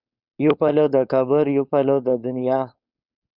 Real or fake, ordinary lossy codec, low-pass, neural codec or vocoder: fake; Opus, 64 kbps; 5.4 kHz; codec, 16 kHz, 4.8 kbps, FACodec